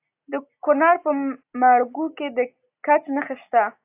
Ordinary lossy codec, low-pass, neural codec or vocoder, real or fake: AAC, 32 kbps; 3.6 kHz; none; real